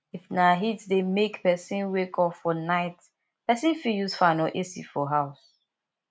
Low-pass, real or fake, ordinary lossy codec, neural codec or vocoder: none; real; none; none